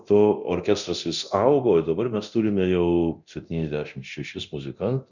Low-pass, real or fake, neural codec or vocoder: 7.2 kHz; fake; codec, 24 kHz, 0.9 kbps, DualCodec